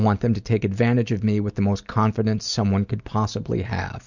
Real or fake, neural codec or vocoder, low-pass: real; none; 7.2 kHz